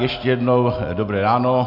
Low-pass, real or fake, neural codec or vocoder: 5.4 kHz; real; none